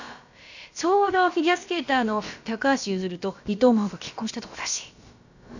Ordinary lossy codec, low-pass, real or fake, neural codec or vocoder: none; 7.2 kHz; fake; codec, 16 kHz, about 1 kbps, DyCAST, with the encoder's durations